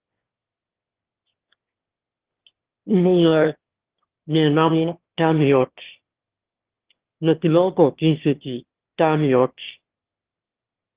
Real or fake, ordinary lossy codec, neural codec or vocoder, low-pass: fake; Opus, 16 kbps; autoencoder, 22.05 kHz, a latent of 192 numbers a frame, VITS, trained on one speaker; 3.6 kHz